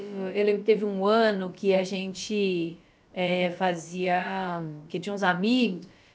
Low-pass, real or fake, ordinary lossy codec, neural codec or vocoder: none; fake; none; codec, 16 kHz, about 1 kbps, DyCAST, with the encoder's durations